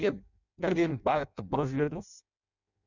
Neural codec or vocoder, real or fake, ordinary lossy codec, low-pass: codec, 16 kHz in and 24 kHz out, 0.6 kbps, FireRedTTS-2 codec; fake; none; 7.2 kHz